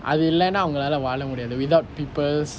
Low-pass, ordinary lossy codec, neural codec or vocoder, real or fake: none; none; none; real